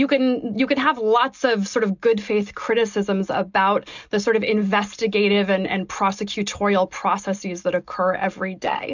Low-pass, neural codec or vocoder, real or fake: 7.2 kHz; none; real